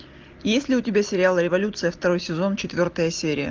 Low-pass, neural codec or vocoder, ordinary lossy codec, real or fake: 7.2 kHz; none; Opus, 32 kbps; real